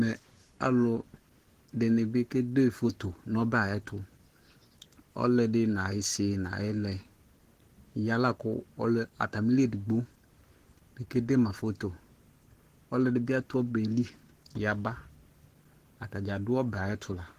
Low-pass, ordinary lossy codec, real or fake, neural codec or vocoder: 14.4 kHz; Opus, 16 kbps; fake; codec, 44.1 kHz, 7.8 kbps, Pupu-Codec